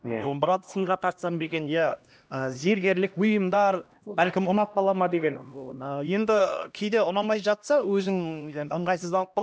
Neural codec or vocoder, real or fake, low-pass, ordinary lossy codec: codec, 16 kHz, 1 kbps, X-Codec, HuBERT features, trained on LibriSpeech; fake; none; none